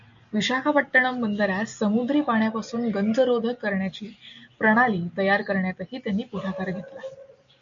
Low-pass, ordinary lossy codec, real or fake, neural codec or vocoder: 7.2 kHz; MP3, 64 kbps; real; none